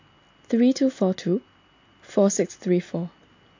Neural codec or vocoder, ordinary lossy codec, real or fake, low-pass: none; AAC, 48 kbps; real; 7.2 kHz